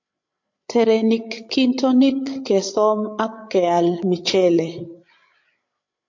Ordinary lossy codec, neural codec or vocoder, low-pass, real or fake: MP3, 48 kbps; codec, 16 kHz, 8 kbps, FreqCodec, larger model; 7.2 kHz; fake